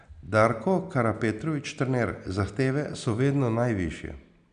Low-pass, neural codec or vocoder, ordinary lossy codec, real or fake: 9.9 kHz; none; none; real